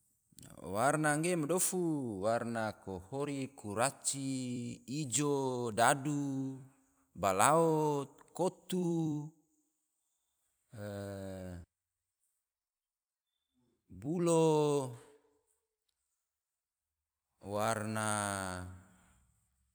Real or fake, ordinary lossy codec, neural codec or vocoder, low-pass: fake; none; vocoder, 44.1 kHz, 128 mel bands every 256 samples, BigVGAN v2; none